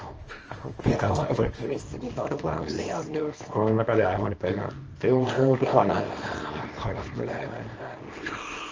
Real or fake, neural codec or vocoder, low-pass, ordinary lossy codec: fake; codec, 24 kHz, 0.9 kbps, WavTokenizer, small release; 7.2 kHz; Opus, 24 kbps